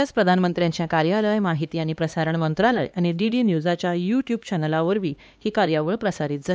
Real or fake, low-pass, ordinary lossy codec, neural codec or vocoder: fake; none; none; codec, 16 kHz, 2 kbps, X-Codec, HuBERT features, trained on LibriSpeech